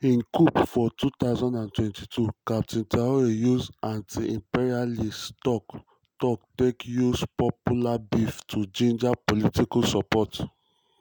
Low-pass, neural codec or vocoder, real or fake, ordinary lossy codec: none; none; real; none